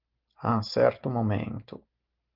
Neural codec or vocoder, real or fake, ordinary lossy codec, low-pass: none; real; Opus, 32 kbps; 5.4 kHz